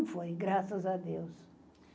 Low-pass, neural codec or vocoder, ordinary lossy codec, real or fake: none; none; none; real